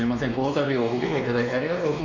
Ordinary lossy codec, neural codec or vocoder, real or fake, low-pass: none; codec, 16 kHz, 2 kbps, X-Codec, WavLM features, trained on Multilingual LibriSpeech; fake; 7.2 kHz